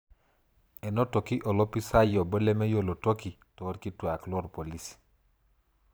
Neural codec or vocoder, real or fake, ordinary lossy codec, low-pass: vocoder, 44.1 kHz, 128 mel bands every 512 samples, BigVGAN v2; fake; none; none